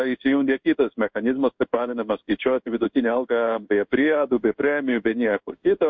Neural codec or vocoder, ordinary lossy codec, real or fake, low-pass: codec, 16 kHz in and 24 kHz out, 1 kbps, XY-Tokenizer; MP3, 48 kbps; fake; 7.2 kHz